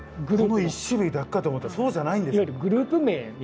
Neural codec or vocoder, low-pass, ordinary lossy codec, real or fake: none; none; none; real